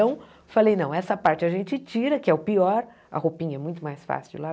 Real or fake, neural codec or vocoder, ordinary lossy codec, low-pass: real; none; none; none